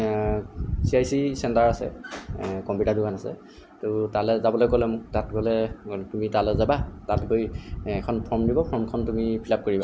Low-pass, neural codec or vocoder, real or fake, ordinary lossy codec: none; none; real; none